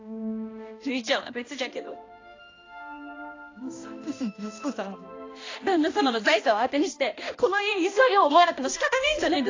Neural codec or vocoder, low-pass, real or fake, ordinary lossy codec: codec, 16 kHz, 1 kbps, X-Codec, HuBERT features, trained on balanced general audio; 7.2 kHz; fake; AAC, 32 kbps